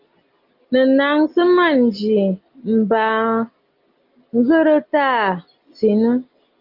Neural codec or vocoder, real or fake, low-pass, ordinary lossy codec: none; real; 5.4 kHz; Opus, 32 kbps